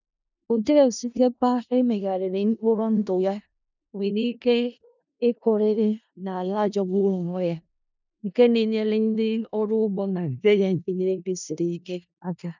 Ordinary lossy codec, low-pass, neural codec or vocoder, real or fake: none; 7.2 kHz; codec, 16 kHz in and 24 kHz out, 0.4 kbps, LongCat-Audio-Codec, four codebook decoder; fake